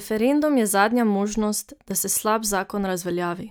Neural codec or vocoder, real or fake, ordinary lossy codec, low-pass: none; real; none; none